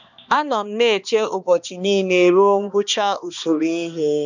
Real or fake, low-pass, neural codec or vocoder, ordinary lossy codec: fake; 7.2 kHz; codec, 16 kHz, 2 kbps, X-Codec, HuBERT features, trained on balanced general audio; none